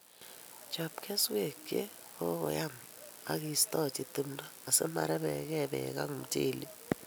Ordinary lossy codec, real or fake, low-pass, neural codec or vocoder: none; real; none; none